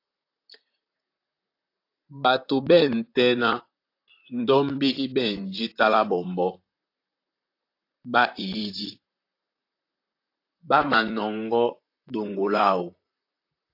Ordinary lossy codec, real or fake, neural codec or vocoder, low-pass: AAC, 32 kbps; fake; vocoder, 44.1 kHz, 128 mel bands, Pupu-Vocoder; 5.4 kHz